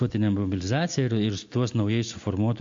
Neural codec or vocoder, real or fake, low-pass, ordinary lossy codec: none; real; 7.2 kHz; MP3, 48 kbps